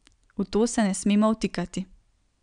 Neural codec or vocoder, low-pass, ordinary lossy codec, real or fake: none; 9.9 kHz; none; real